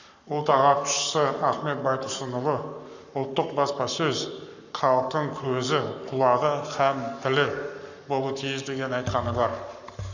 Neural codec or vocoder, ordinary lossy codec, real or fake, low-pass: codec, 44.1 kHz, 7.8 kbps, Pupu-Codec; none; fake; 7.2 kHz